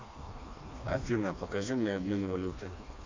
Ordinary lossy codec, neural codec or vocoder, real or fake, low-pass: MP3, 48 kbps; codec, 16 kHz, 2 kbps, FreqCodec, smaller model; fake; 7.2 kHz